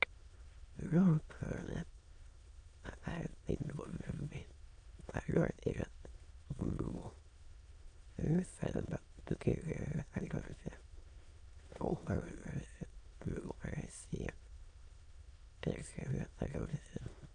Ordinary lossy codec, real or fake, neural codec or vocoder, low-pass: AAC, 48 kbps; fake; autoencoder, 22.05 kHz, a latent of 192 numbers a frame, VITS, trained on many speakers; 9.9 kHz